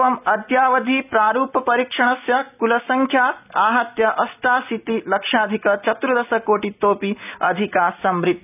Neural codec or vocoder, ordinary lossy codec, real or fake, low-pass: none; none; real; 3.6 kHz